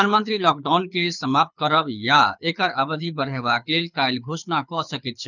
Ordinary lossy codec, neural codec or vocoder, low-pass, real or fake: none; codec, 24 kHz, 6 kbps, HILCodec; 7.2 kHz; fake